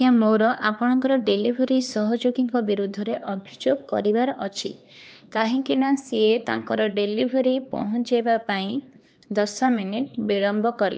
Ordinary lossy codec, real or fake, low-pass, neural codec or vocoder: none; fake; none; codec, 16 kHz, 2 kbps, X-Codec, HuBERT features, trained on LibriSpeech